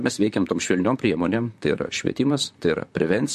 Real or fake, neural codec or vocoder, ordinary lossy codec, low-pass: fake; vocoder, 44.1 kHz, 128 mel bands, Pupu-Vocoder; MP3, 64 kbps; 14.4 kHz